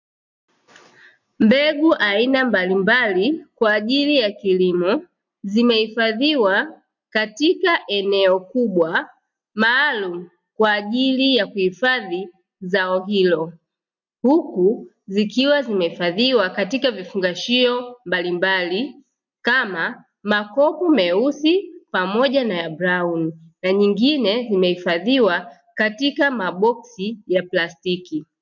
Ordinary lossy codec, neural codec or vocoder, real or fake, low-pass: MP3, 64 kbps; none; real; 7.2 kHz